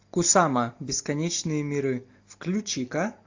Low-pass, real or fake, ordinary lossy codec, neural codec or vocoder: 7.2 kHz; real; AAC, 48 kbps; none